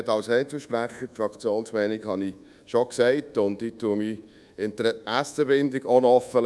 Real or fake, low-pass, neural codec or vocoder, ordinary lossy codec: fake; none; codec, 24 kHz, 1.2 kbps, DualCodec; none